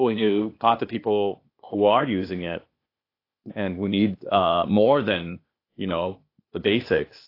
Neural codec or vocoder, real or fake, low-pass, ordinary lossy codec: codec, 16 kHz, 0.8 kbps, ZipCodec; fake; 5.4 kHz; AAC, 32 kbps